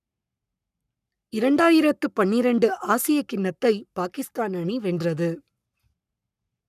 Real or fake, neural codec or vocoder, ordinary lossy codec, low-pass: fake; codec, 44.1 kHz, 7.8 kbps, Pupu-Codec; none; 14.4 kHz